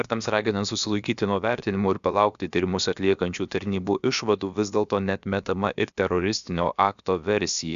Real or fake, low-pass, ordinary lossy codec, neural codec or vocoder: fake; 7.2 kHz; Opus, 64 kbps; codec, 16 kHz, about 1 kbps, DyCAST, with the encoder's durations